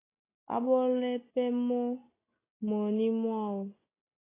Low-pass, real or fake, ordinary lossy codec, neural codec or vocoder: 3.6 kHz; real; AAC, 16 kbps; none